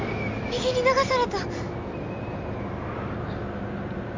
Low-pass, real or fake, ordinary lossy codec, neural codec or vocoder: 7.2 kHz; real; MP3, 64 kbps; none